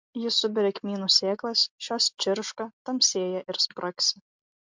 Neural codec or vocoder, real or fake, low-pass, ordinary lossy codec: none; real; 7.2 kHz; MP3, 64 kbps